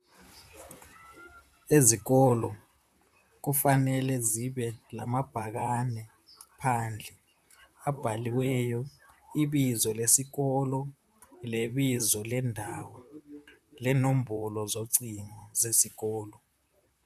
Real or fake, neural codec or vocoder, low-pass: fake; vocoder, 44.1 kHz, 128 mel bands, Pupu-Vocoder; 14.4 kHz